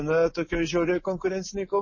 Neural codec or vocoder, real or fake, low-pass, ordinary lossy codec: none; real; 7.2 kHz; MP3, 32 kbps